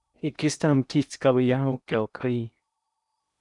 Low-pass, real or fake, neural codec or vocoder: 10.8 kHz; fake; codec, 16 kHz in and 24 kHz out, 0.8 kbps, FocalCodec, streaming, 65536 codes